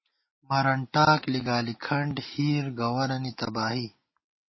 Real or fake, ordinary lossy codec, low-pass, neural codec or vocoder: real; MP3, 24 kbps; 7.2 kHz; none